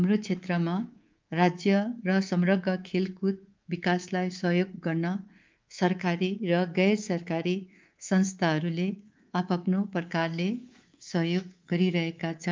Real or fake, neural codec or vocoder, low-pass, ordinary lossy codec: fake; codec, 24 kHz, 3.1 kbps, DualCodec; 7.2 kHz; Opus, 24 kbps